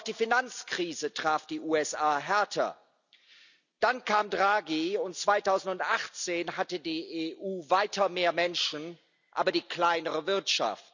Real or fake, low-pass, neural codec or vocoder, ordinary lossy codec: real; 7.2 kHz; none; none